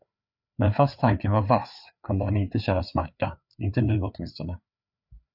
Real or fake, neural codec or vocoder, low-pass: fake; codec, 16 kHz, 4 kbps, FreqCodec, larger model; 5.4 kHz